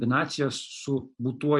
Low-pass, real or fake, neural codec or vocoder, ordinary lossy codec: 10.8 kHz; fake; vocoder, 44.1 kHz, 128 mel bands every 256 samples, BigVGAN v2; MP3, 64 kbps